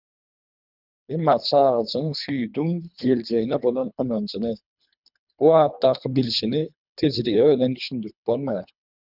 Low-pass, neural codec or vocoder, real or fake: 5.4 kHz; codec, 24 kHz, 3 kbps, HILCodec; fake